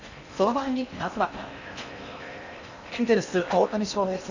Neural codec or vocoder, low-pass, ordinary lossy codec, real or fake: codec, 16 kHz in and 24 kHz out, 0.8 kbps, FocalCodec, streaming, 65536 codes; 7.2 kHz; none; fake